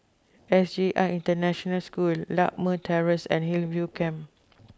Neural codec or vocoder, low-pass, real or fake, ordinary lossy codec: none; none; real; none